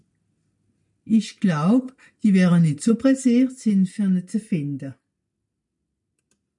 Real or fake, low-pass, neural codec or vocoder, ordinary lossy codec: real; 10.8 kHz; none; MP3, 96 kbps